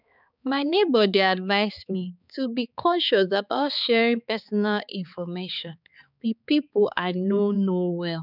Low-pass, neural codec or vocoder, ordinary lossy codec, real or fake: 5.4 kHz; codec, 16 kHz, 4 kbps, X-Codec, HuBERT features, trained on balanced general audio; none; fake